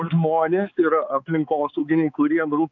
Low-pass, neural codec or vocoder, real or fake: 7.2 kHz; codec, 16 kHz, 4 kbps, X-Codec, HuBERT features, trained on balanced general audio; fake